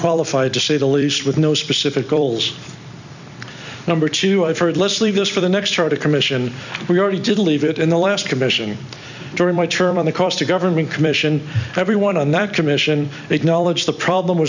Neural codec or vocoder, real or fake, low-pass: vocoder, 44.1 kHz, 128 mel bands every 256 samples, BigVGAN v2; fake; 7.2 kHz